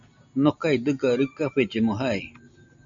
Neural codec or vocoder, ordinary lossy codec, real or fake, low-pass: none; MP3, 64 kbps; real; 7.2 kHz